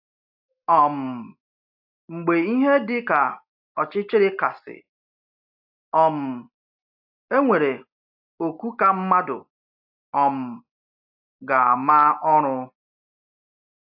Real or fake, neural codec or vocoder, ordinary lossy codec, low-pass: real; none; AAC, 48 kbps; 5.4 kHz